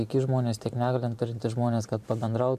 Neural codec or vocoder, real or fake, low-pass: vocoder, 44.1 kHz, 128 mel bands every 512 samples, BigVGAN v2; fake; 14.4 kHz